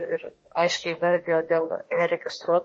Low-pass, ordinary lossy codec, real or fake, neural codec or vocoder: 10.8 kHz; MP3, 32 kbps; fake; autoencoder, 48 kHz, 32 numbers a frame, DAC-VAE, trained on Japanese speech